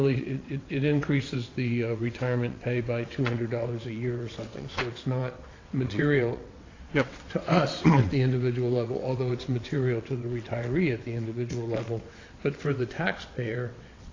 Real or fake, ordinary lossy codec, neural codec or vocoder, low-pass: fake; AAC, 32 kbps; vocoder, 22.05 kHz, 80 mel bands, WaveNeXt; 7.2 kHz